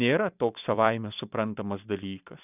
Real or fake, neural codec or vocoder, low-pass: fake; vocoder, 44.1 kHz, 128 mel bands every 256 samples, BigVGAN v2; 3.6 kHz